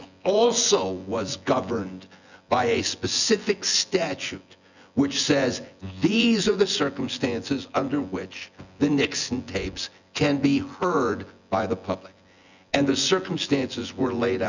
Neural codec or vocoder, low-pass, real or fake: vocoder, 24 kHz, 100 mel bands, Vocos; 7.2 kHz; fake